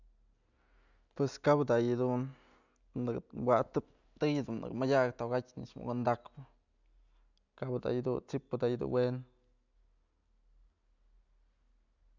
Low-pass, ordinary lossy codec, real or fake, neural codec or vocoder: 7.2 kHz; none; real; none